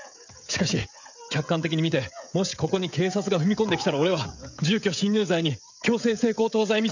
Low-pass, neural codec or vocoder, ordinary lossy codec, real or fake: 7.2 kHz; codec, 16 kHz, 16 kbps, FreqCodec, smaller model; none; fake